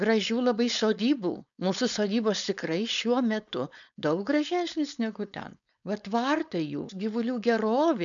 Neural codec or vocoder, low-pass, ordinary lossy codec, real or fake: codec, 16 kHz, 4.8 kbps, FACodec; 7.2 kHz; MP3, 96 kbps; fake